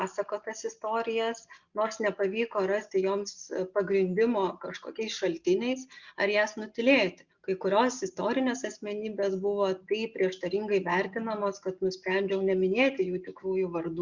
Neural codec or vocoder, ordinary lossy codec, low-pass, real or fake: none; Opus, 64 kbps; 7.2 kHz; real